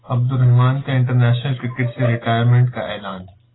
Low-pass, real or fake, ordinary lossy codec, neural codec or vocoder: 7.2 kHz; real; AAC, 16 kbps; none